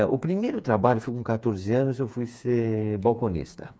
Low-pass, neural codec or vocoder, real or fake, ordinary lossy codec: none; codec, 16 kHz, 4 kbps, FreqCodec, smaller model; fake; none